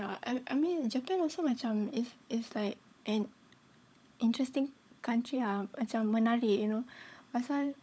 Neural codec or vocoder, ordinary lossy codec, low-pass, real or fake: codec, 16 kHz, 16 kbps, FunCodec, trained on LibriTTS, 50 frames a second; none; none; fake